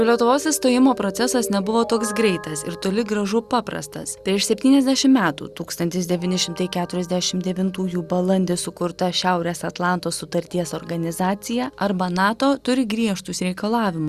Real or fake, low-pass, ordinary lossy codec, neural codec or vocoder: real; 14.4 kHz; Opus, 64 kbps; none